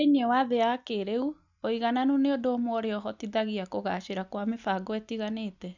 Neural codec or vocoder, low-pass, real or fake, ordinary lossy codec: none; 7.2 kHz; real; none